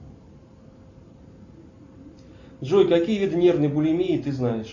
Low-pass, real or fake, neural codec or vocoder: 7.2 kHz; real; none